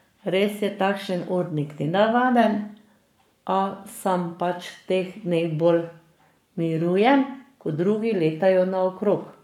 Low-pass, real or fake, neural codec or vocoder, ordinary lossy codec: 19.8 kHz; fake; codec, 44.1 kHz, 7.8 kbps, Pupu-Codec; none